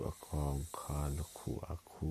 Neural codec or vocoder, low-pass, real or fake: none; 14.4 kHz; real